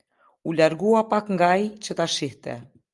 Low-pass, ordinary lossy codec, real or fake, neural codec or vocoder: 10.8 kHz; Opus, 32 kbps; real; none